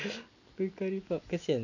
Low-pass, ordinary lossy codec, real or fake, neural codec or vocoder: 7.2 kHz; none; real; none